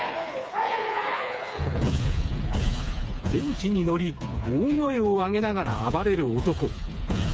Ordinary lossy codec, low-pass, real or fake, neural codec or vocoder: none; none; fake; codec, 16 kHz, 4 kbps, FreqCodec, smaller model